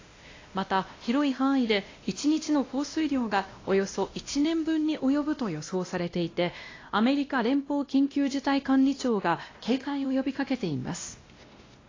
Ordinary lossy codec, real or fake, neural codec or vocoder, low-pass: AAC, 32 kbps; fake; codec, 16 kHz, 1 kbps, X-Codec, WavLM features, trained on Multilingual LibriSpeech; 7.2 kHz